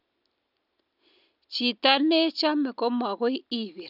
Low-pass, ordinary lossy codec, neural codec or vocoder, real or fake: 5.4 kHz; none; none; real